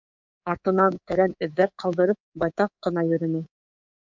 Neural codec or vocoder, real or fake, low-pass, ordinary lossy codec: codec, 24 kHz, 3.1 kbps, DualCodec; fake; 7.2 kHz; MP3, 64 kbps